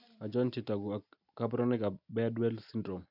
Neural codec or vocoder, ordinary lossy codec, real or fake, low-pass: none; none; real; 5.4 kHz